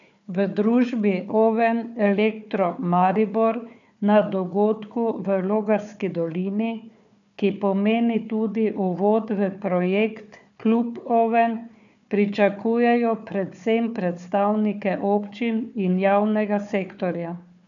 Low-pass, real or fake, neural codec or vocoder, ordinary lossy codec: 7.2 kHz; fake; codec, 16 kHz, 4 kbps, FunCodec, trained on Chinese and English, 50 frames a second; none